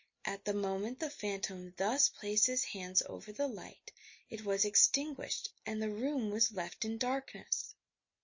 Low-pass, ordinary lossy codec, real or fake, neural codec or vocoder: 7.2 kHz; MP3, 32 kbps; real; none